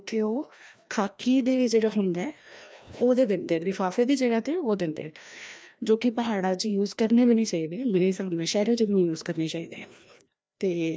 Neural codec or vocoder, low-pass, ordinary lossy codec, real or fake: codec, 16 kHz, 1 kbps, FreqCodec, larger model; none; none; fake